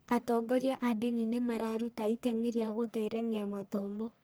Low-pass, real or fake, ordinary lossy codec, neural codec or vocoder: none; fake; none; codec, 44.1 kHz, 1.7 kbps, Pupu-Codec